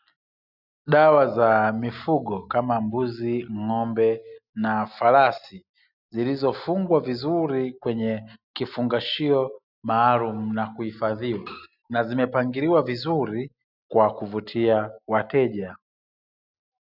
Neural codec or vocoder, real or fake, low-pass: none; real; 5.4 kHz